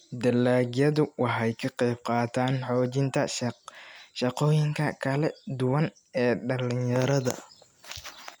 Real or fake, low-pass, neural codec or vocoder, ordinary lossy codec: real; none; none; none